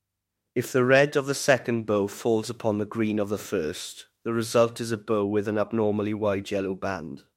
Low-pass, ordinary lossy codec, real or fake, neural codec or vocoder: 19.8 kHz; MP3, 64 kbps; fake; autoencoder, 48 kHz, 32 numbers a frame, DAC-VAE, trained on Japanese speech